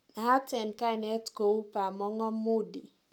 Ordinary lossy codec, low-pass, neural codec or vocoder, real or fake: none; 19.8 kHz; codec, 44.1 kHz, 7.8 kbps, Pupu-Codec; fake